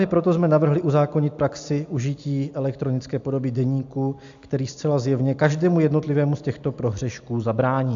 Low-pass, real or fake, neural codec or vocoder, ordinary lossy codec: 7.2 kHz; real; none; AAC, 64 kbps